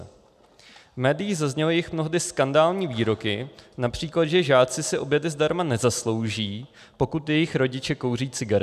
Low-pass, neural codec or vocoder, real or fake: 14.4 kHz; none; real